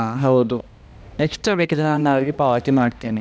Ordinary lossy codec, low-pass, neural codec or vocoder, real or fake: none; none; codec, 16 kHz, 1 kbps, X-Codec, HuBERT features, trained on balanced general audio; fake